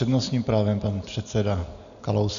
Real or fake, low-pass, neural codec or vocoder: real; 7.2 kHz; none